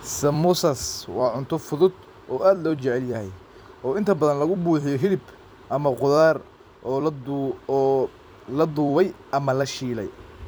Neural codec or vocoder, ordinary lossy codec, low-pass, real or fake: vocoder, 44.1 kHz, 128 mel bands every 256 samples, BigVGAN v2; none; none; fake